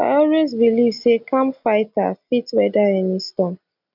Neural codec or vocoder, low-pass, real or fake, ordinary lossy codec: none; 5.4 kHz; real; none